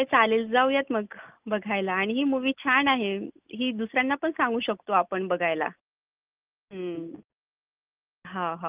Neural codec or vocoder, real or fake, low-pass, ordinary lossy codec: none; real; 3.6 kHz; Opus, 32 kbps